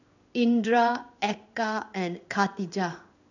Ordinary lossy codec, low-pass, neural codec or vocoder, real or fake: none; 7.2 kHz; codec, 16 kHz in and 24 kHz out, 1 kbps, XY-Tokenizer; fake